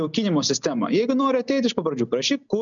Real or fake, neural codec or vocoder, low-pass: real; none; 7.2 kHz